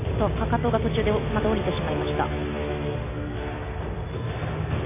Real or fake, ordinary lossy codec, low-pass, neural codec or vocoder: real; AAC, 24 kbps; 3.6 kHz; none